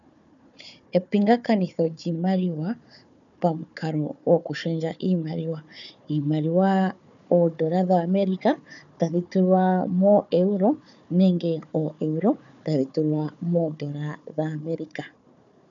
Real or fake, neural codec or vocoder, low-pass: fake; codec, 16 kHz, 4 kbps, FunCodec, trained on Chinese and English, 50 frames a second; 7.2 kHz